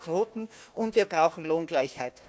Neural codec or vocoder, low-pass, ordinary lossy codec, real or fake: codec, 16 kHz, 1 kbps, FunCodec, trained on Chinese and English, 50 frames a second; none; none; fake